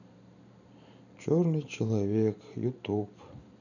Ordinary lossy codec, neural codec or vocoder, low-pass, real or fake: none; vocoder, 44.1 kHz, 128 mel bands every 512 samples, BigVGAN v2; 7.2 kHz; fake